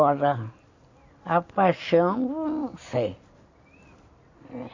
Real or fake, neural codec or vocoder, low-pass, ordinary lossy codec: real; none; 7.2 kHz; AAC, 48 kbps